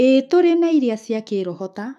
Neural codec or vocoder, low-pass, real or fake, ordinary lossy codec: autoencoder, 48 kHz, 128 numbers a frame, DAC-VAE, trained on Japanese speech; 14.4 kHz; fake; none